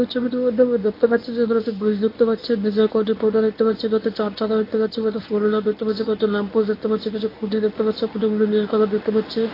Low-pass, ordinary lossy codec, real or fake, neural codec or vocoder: 5.4 kHz; AAC, 24 kbps; fake; codec, 24 kHz, 0.9 kbps, WavTokenizer, medium speech release version 1